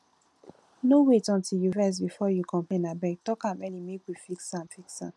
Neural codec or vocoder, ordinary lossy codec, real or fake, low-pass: none; none; real; none